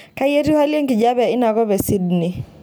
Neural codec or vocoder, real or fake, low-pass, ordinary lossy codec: none; real; none; none